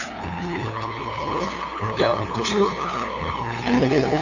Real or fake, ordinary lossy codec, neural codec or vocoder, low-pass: fake; none; codec, 16 kHz, 2 kbps, FunCodec, trained on LibriTTS, 25 frames a second; 7.2 kHz